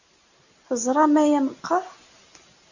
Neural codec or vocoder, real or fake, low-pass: none; real; 7.2 kHz